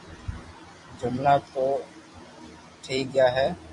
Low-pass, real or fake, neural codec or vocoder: 10.8 kHz; fake; vocoder, 44.1 kHz, 128 mel bands every 256 samples, BigVGAN v2